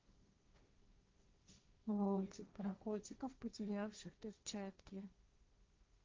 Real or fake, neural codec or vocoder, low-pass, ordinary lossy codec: fake; codec, 16 kHz, 1.1 kbps, Voila-Tokenizer; 7.2 kHz; Opus, 24 kbps